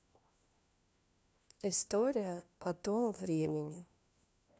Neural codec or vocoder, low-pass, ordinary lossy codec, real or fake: codec, 16 kHz, 1 kbps, FunCodec, trained on LibriTTS, 50 frames a second; none; none; fake